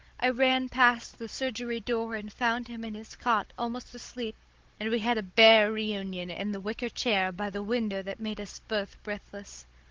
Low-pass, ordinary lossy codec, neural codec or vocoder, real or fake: 7.2 kHz; Opus, 16 kbps; codec, 16 kHz, 16 kbps, FunCodec, trained on Chinese and English, 50 frames a second; fake